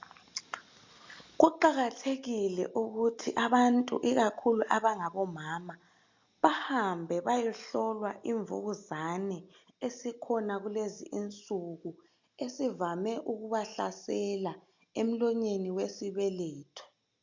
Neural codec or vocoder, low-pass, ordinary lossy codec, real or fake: none; 7.2 kHz; MP3, 48 kbps; real